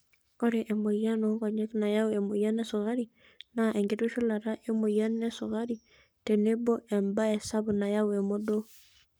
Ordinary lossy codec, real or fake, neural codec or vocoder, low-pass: none; fake; codec, 44.1 kHz, 7.8 kbps, Pupu-Codec; none